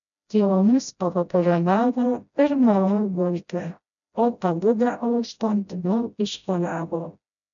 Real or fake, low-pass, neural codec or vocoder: fake; 7.2 kHz; codec, 16 kHz, 0.5 kbps, FreqCodec, smaller model